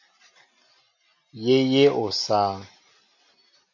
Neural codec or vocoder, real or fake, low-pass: none; real; 7.2 kHz